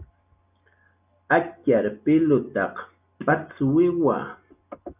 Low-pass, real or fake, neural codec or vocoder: 3.6 kHz; real; none